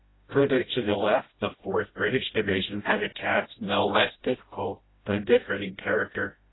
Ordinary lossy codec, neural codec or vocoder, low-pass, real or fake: AAC, 16 kbps; codec, 16 kHz, 0.5 kbps, FreqCodec, smaller model; 7.2 kHz; fake